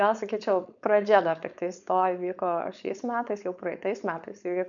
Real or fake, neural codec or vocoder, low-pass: fake; codec, 16 kHz, 4.8 kbps, FACodec; 7.2 kHz